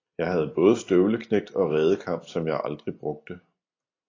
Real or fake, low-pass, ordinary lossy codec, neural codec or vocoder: real; 7.2 kHz; AAC, 32 kbps; none